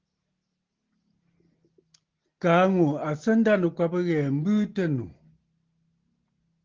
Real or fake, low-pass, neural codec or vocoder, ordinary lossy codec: real; 7.2 kHz; none; Opus, 16 kbps